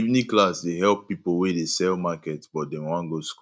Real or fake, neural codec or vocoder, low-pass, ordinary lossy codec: real; none; none; none